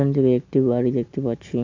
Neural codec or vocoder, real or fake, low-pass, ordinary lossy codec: none; real; 7.2 kHz; AAC, 48 kbps